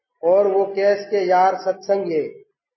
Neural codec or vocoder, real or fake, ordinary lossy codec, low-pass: none; real; MP3, 24 kbps; 7.2 kHz